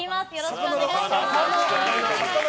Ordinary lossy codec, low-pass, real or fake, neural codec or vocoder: none; none; real; none